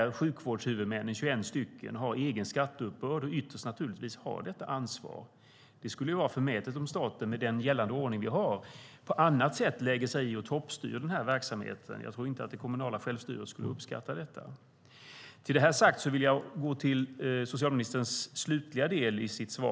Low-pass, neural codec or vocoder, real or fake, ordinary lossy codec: none; none; real; none